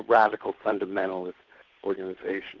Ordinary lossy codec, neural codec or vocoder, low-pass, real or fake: Opus, 16 kbps; codec, 16 kHz, 8 kbps, FunCodec, trained on Chinese and English, 25 frames a second; 7.2 kHz; fake